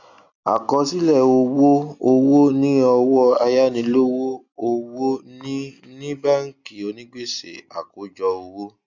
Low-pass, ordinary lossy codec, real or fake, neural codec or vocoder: 7.2 kHz; none; real; none